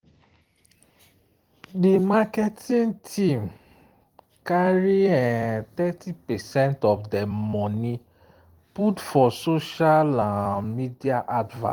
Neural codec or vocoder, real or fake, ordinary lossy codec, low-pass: vocoder, 44.1 kHz, 128 mel bands every 256 samples, BigVGAN v2; fake; Opus, 24 kbps; 19.8 kHz